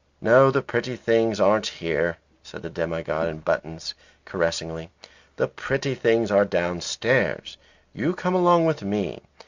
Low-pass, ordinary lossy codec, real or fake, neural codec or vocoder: 7.2 kHz; Opus, 64 kbps; real; none